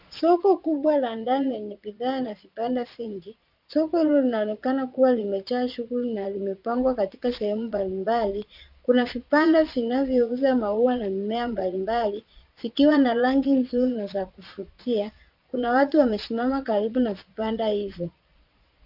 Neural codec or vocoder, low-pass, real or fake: vocoder, 22.05 kHz, 80 mel bands, Vocos; 5.4 kHz; fake